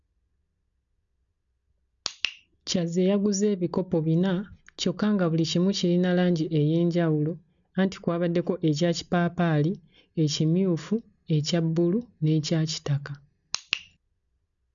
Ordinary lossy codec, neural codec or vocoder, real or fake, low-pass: none; none; real; 7.2 kHz